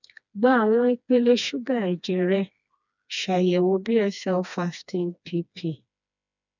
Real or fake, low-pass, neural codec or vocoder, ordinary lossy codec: fake; 7.2 kHz; codec, 16 kHz, 2 kbps, FreqCodec, smaller model; none